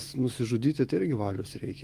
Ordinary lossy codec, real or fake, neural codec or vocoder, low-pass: Opus, 32 kbps; fake; autoencoder, 48 kHz, 128 numbers a frame, DAC-VAE, trained on Japanese speech; 14.4 kHz